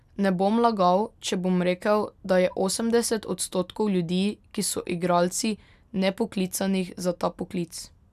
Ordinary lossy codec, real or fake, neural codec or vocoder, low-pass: none; real; none; 14.4 kHz